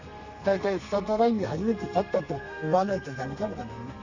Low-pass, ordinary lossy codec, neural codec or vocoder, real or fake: 7.2 kHz; none; codec, 32 kHz, 1.9 kbps, SNAC; fake